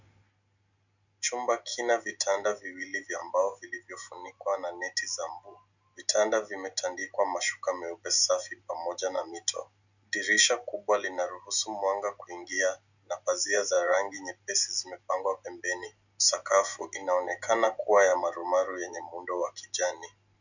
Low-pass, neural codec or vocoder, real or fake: 7.2 kHz; none; real